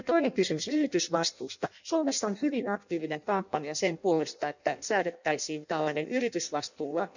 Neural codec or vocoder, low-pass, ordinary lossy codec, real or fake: codec, 16 kHz in and 24 kHz out, 0.6 kbps, FireRedTTS-2 codec; 7.2 kHz; none; fake